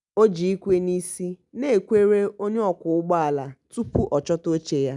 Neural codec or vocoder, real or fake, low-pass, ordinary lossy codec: none; real; 10.8 kHz; none